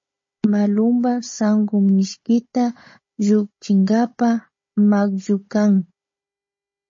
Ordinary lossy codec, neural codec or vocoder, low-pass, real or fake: MP3, 32 kbps; codec, 16 kHz, 16 kbps, FunCodec, trained on Chinese and English, 50 frames a second; 7.2 kHz; fake